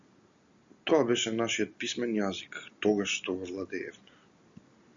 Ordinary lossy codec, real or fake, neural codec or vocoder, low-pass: Opus, 64 kbps; real; none; 7.2 kHz